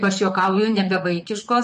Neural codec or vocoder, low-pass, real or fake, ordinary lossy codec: vocoder, 44.1 kHz, 128 mel bands, Pupu-Vocoder; 14.4 kHz; fake; MP3, 48 kbps